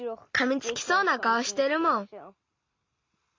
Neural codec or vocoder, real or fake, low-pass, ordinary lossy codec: none; real; 7.2 kHz; MP3, 64 kbps